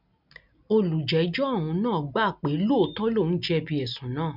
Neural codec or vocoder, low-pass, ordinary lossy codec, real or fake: none; 5.4 kHz; none; real